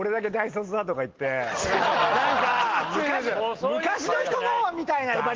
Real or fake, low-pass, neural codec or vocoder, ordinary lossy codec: real; 7.2 kHz; none; Opus, 16 kbps